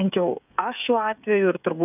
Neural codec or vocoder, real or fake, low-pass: codec, 16 kHz, 8 kbps, FreqCodec, smaller model; fake; 3.6 kHz